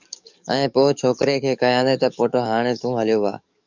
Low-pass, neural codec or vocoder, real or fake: 7.2 kHz; codec, 44.1 kHz, 7.8 kbps, DAC; fake